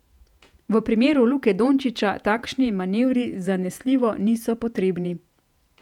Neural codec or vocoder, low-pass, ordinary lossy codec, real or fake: vocoder, 48 kHz, 128 mel bands, Vocos; 19.8 kHz; none; fake